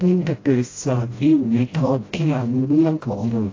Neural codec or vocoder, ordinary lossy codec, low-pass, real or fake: codec, 16 kHz, 0.5 kbps, FreqCodec, smaller model; AAC, 32 kbps; 7.2 kHz; fake